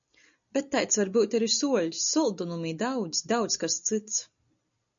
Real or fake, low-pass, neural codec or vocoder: real; 7.2 kHz; none